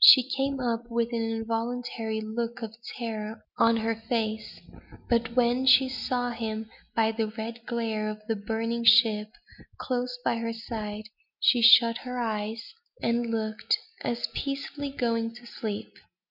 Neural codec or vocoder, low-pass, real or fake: none; 5.4 kHz; real